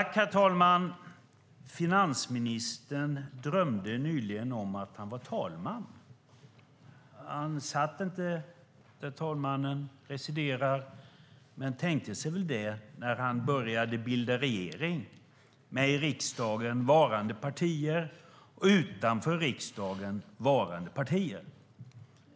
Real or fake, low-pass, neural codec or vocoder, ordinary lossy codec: real; none; none; none